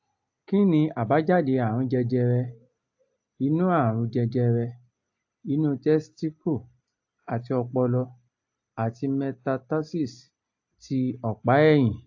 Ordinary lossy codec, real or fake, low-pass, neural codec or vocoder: AAC, 48 kbps; real; 7.2 kHz; none